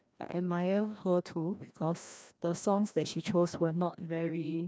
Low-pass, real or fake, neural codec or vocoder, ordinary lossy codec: none; fake; codec, 16 kHz, 1 kbps, FreqCodec, larger model; none